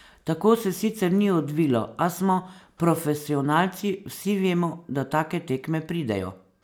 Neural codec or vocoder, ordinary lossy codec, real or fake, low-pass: none; none; real; none